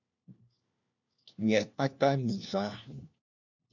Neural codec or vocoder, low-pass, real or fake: codec, 16 kHz, 1 kbps, FunCodec, trained on LibriTTS, 50 frames a second; 7.2 kHz; fake